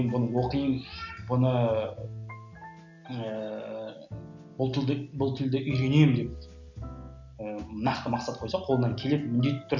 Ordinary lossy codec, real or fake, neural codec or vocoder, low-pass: none; real; none; 7.2 kHz